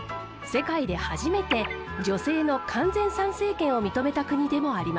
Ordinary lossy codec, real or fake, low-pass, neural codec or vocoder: none; real; none; none